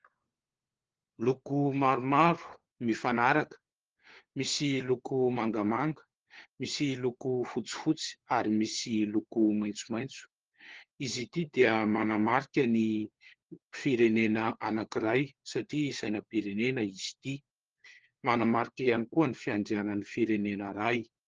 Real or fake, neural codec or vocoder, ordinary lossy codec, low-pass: fake; codec, 16 kHz, 4 kbps, FunCodec, trained on LibriTTS, 50 frames a second; Opus, 16 kbps; 7.2 kHz